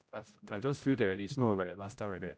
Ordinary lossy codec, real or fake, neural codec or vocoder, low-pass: none; fake; codec, 16 kHz, 0.5 kbps, X-Codec, HuBERT features, trained on general audio; none